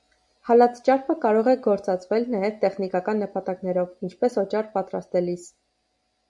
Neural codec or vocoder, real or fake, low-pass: none; real; 10.8 kHz